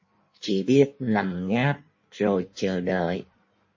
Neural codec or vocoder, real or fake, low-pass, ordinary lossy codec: codec, 16 kHz in and 24 kHz out, 1.1 kbps, FireRedTTS-2 codec; fake; 7.2 kHz; MP3, 32 kbps